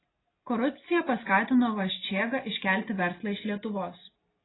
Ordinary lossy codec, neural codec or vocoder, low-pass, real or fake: AAC, 16 kbps; none; 7.2 kHz; real